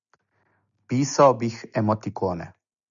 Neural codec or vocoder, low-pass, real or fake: none; 7.2 kHz; real